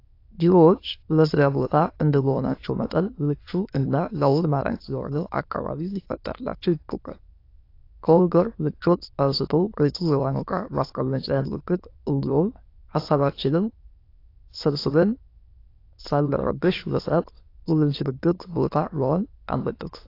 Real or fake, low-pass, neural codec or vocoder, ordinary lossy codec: fake; 5.4 kHz; autoencoder, 22.05 kHz, a latent of 192 numbers a frame, VITS, trained on many speakers; AAC, 32 kbps